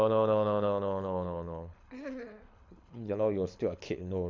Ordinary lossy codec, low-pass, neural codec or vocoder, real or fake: MP3, 64 kbps; 7.2 kHz; codec, 24 kHz, 6 kbps, HILCodec; fake